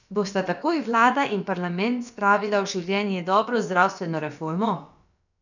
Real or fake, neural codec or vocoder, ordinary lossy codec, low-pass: fake; codec, 16 kHz, about 1 kbps, DyCAST, with the encoder's durations; none; 7.2 kHz